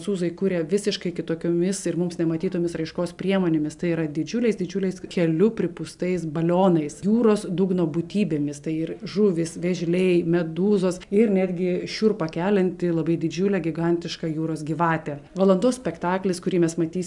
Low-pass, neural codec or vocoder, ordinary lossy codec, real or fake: 10.8 kHz; none; MP3, 96 kbps; real